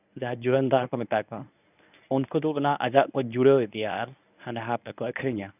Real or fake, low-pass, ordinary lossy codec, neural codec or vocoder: fake; 3.6 kHz; none; codec, 24 kHz, 0.9 kbps, WavTokenizer, medium speech release version 1